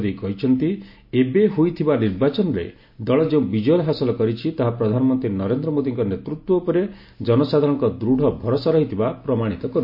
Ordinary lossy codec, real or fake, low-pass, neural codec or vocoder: MP3, 32 kbps; real; 5.4 kHz; none